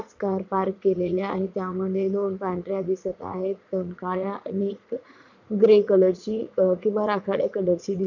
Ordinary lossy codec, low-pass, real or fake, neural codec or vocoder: none; 7.2 kHz; fake; vocoder, 44.1 kHz, 80 mel bands, Vocos